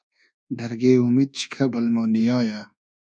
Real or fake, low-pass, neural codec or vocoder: fake; 9.9 kHz; codec, 24 kHz, 1.2 kbps, DualCodec